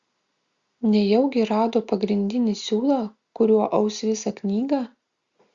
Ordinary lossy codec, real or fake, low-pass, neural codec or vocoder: Opus, 64 kbps; real; 7.2 kHz; none